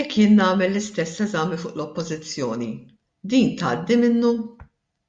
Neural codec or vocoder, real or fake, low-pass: none; real; 9.9 kHz